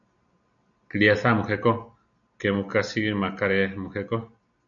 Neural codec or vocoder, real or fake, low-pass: none; real; 7.2 kHz